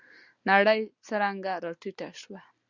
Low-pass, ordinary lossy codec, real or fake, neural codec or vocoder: 7.2 kHz; Opus, 64 kbps; real; none